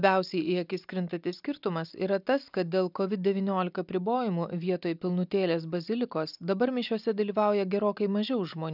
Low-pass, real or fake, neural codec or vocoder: 5.4 kHz; real; none